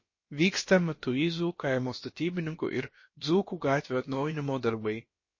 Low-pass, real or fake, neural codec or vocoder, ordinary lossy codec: 7.2 kHz; fake; codec, 16 kHz, about 1 kbps, DyCAST, with the encoder's durations; MP3, 32 kbps